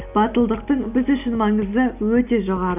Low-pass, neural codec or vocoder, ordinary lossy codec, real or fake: 3.6 kHz; none; none; real